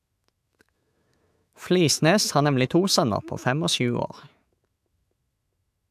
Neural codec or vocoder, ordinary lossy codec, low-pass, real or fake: autoencoder, 48 kHz, 128 numbers a frame, DAC-VAE, trained on Japanese speech; AAC, 96 kbps; 14.4 kHz; fake